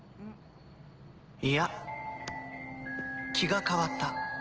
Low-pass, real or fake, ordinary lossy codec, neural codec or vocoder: 7.2 kHz; real; Opus, 16 kbps; none